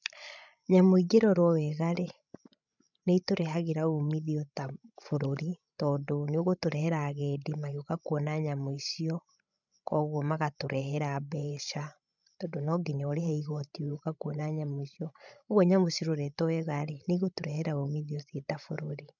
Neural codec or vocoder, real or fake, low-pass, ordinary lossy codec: codec, 16 kHz, 16 kbps, FreqCodec, larger model; fake; 7.2 kHz; none